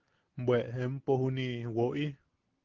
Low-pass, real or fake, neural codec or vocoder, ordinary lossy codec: 7.2 kHz; real; none; Opus, 16 kbps